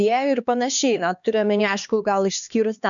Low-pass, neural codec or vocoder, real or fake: 7.2 kHz; codec, 16 kHz, 2 kbps, X-Codec, HuBERT features, trained on LibriSpeech; fake